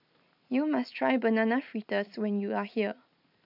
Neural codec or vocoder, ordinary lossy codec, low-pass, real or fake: none; none; 5.4 kHz; real